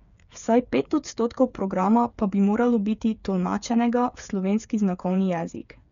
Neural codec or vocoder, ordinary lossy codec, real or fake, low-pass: codec, 16 kHz, 8 kbps, FreqCodec, smaller model; none; fake; 7.2 kHz